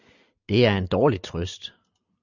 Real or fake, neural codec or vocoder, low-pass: real; none; 7.2 kHz